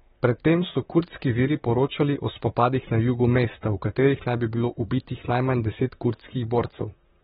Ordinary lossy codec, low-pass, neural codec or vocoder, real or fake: AAC, 16 kbps; 19.8 kHz; vocoder, 44.1 kHz, 128 mel bands, Pupu-Vocoder; fake